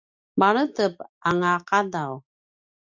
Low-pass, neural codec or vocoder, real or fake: 7.2 kHz; none; real